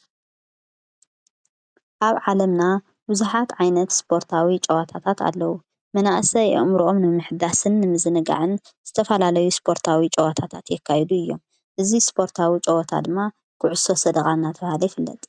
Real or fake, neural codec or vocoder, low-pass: real; none; 9.9 kHz